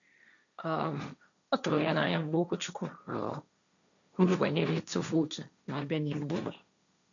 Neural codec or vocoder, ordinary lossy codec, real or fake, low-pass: codec, 16 kHz, 1.1 kbps, Voila-Tokenizer; none; fake; 7.2 kHz